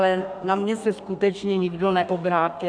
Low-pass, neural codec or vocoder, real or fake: 9.9 kHz; codec, 32 kHz, 1.9 kbps, SNAC; fake